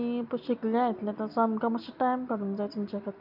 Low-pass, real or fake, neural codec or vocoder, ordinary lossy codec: 5.4 kHz; real; none; none